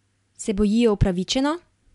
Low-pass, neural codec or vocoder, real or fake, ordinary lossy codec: 10.8 kHz; none; real; none